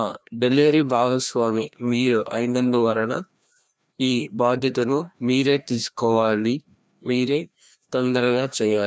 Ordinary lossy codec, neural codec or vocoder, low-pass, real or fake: none; codec, 16 kHz, 1 kbps, FreqCodec, larger model; none; fake